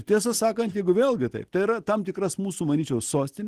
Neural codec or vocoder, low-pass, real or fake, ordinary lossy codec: none; 14.4 kHz; real; Opus, 16 kbps